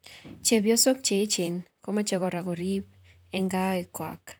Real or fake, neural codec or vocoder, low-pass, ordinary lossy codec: fake; vocoder, 44.1 kHz, 128 mel bands, Pupu-Vocoder; none; none